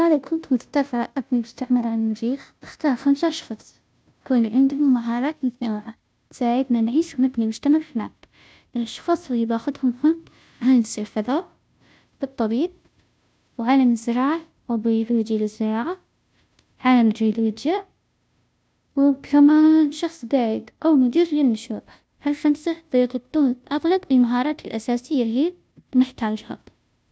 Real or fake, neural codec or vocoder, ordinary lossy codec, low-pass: fake; codec, 16 kHz, 0.5 kbps, FunCodec, trained on Chinese and English, 25 frames a second; none; none